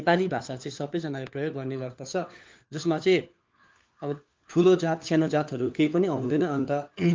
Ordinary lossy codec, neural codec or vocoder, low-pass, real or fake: Opus, 32 kbps; codec, 16 kHz, 4 kbps, FunCodec, trained on LibriTTS, 50 frames a second; 7.2 kHz; fake